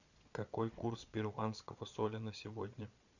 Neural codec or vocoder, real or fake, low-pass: vocoder, 22.05 kHz, 80 mel bands, Vocos; fake; 7.2 kHz